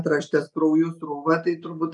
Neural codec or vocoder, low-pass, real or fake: none; 10.8 kHz; real